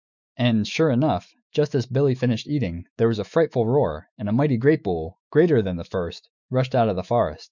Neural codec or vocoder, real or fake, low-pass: autoencoder, 48 kHz, 128 numbers a frame, DAC-VAE, trained on Japanese speech; fake; 7.2 kHz